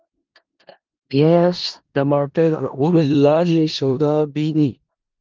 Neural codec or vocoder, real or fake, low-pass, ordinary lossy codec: codec, 16 kHz in and 24 kHz out, 0.4 kbps, LongCat-Audio-Codec, four codebook decoder; fake; 7.2 kHz; Opus, 32 kbps